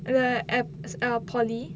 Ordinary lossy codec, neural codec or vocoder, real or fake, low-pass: none; none; real; none